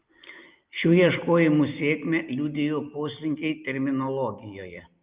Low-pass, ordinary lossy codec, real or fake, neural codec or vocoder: 3.6 kHz; Opus, 24 kbps; real; none